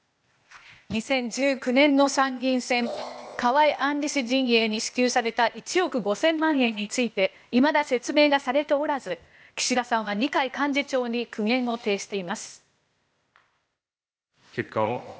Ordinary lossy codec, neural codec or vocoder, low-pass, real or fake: none; codec, 16 kHz, 0.8 kbps, ZipCodec; none; fake